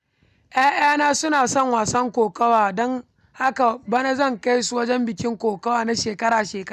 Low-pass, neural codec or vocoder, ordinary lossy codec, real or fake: 14.4 kHz; none; none; real